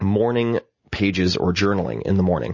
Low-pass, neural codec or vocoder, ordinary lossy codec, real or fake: 7.2 kHz; none; MP3, 32 kbps; real